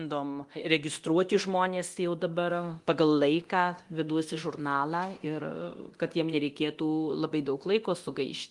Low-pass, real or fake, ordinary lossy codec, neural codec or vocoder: 10.8 kHz; fake; Opus, 24 kbps; codec, 24 kHz, 0.9 kbps, DualCodec